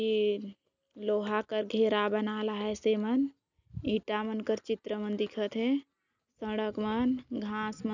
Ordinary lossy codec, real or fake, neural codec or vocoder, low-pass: none; real; none; 7.2 kHz